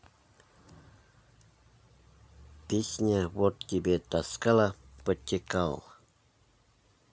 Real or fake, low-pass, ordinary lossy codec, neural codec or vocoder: real; none; none; none